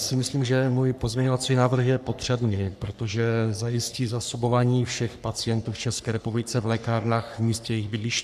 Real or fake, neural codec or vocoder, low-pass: fake; codec, 44.1 kHz, 3.4 kbps, Pupu-Codec; 14.4 kHz